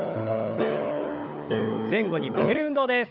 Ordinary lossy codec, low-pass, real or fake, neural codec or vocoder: none; 5.4 kHz; fake; codec, 16 kHz, 16 kbps, FunCodec, trained on LibriTTS, 50 frames a second